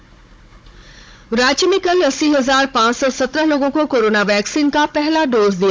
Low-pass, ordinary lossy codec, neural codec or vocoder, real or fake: none; none; codec, 16 kHz, 16 kbps, FunCodec, trained on Chinese and English, 50 frames a second; fake